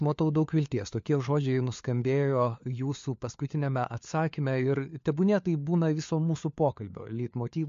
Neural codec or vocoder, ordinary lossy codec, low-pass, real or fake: codec, 16 kHz, 4 kbps, FunCodec, trained on LibriTTS, 50 frames a second; MP3, 48 kbps; 7.2 kHz; fake